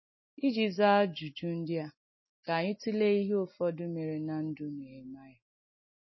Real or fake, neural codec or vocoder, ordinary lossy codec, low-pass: real; none; MP3, 24 kbps; 7.2 kHz